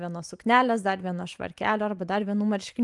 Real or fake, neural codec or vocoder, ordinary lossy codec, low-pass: fake; vocoder, 44.1 kHz, 128 mel bands every 512 samples, BigVGAN v2; Opus, 32 kbps; 10.8 kHz